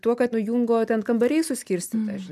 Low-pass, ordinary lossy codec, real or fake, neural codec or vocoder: 14.4 kHz; MP3, 96 kbps; real; none